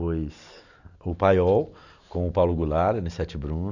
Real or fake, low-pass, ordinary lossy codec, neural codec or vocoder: real; 7.2 kHz; none; none